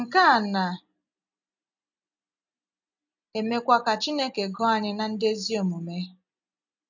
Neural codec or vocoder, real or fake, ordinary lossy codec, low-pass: none; real; none; 7.2 kHz